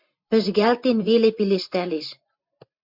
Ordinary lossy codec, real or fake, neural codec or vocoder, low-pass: MP3, 48 kbps; fake; vocoder, 44.1 kHz, 128 mel bands every 512 samples, BigVGAN v2; 5.4 kHz